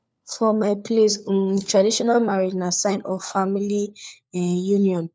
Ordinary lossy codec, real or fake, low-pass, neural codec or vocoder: none; fake; none; codec, 16 kHz, 4 kbps, FunCodec, trained on LibriTTS, 50 frames a second